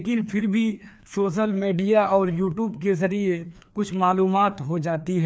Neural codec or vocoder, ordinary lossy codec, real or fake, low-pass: codec, 16 kHz, 2 kbps, FreqCodec, larger model; none; fake; none